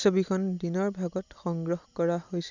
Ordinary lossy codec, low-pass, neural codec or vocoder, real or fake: none; 7.2 kHz; none; real